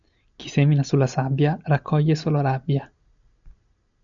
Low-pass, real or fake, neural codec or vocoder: 7.2 kHz; real; none